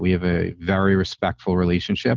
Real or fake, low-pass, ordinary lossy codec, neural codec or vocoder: real; 7.2 kHz; Opus, 24 kbps; none